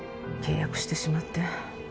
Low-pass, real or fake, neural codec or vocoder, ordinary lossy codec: none; real; none; none